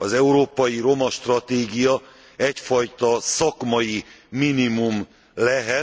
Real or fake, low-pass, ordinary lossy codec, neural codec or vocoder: real; none; none; none